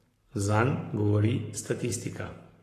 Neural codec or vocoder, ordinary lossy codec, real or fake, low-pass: codec, 44.1 kHz, 7.8 kbps, Pupu-Codec; AAC, 48 kbps; fake; 14.4 kHz